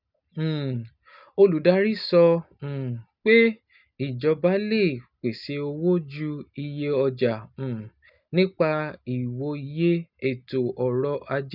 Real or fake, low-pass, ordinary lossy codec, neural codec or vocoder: real; 5.4 kHz; none; none